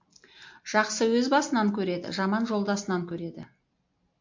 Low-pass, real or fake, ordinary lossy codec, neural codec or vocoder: 7.2 kHz; real; MP3, 48 kbps; none